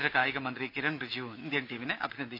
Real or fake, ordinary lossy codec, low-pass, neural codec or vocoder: real; none; 5.4 kHz; none